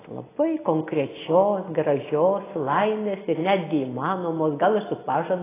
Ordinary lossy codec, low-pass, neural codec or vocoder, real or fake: AAC, 16 kbps; 3.6 kHz; none; real